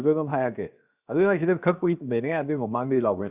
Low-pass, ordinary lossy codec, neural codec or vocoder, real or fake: 3.6 kHz; Opus, 64 kbps; codec, 16 kHz, 0.7 kbps, FocalCodec; fake